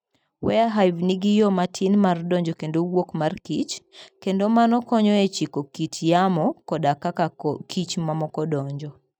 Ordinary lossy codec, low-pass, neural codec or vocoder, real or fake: none; 19.8 kHz; none; real